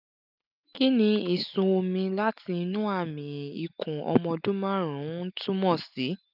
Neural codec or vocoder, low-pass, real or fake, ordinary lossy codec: none; 5.4 kHz; real; none